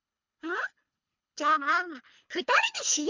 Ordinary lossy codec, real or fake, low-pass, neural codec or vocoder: MP3, 64 kbps; fake; 7.2 kHz; codec, 24 kHz, 3 kbps, HILCodec